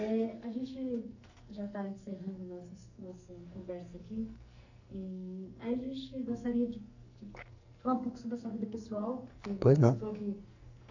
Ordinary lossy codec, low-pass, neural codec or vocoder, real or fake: none; 7.2 kHz; codec, 44.1 kHz, 2.6 kbps, SNAC; fake